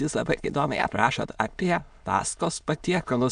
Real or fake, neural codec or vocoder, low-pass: fake; autoencoder, 22.05 kHz, a latent of 192 numbers a frame, VITS, trained on many speakers; 9.9 kHz